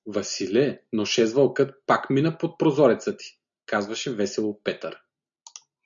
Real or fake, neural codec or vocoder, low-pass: real; none; 7.2 kHz